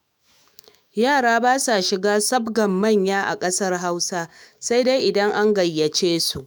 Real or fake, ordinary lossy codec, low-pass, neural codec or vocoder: fake; none; none; autoencoder, 48 kHz, 128 numbers a frame, DAC-VAE, trained on Japanese speech